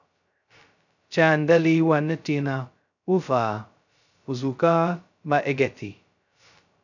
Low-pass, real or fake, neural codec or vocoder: 7.2 kHz; fake; codec, 16 kHz, 0.2 kbps, FocalCodec